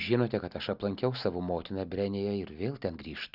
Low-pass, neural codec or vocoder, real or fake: 5.4 kHz; none; real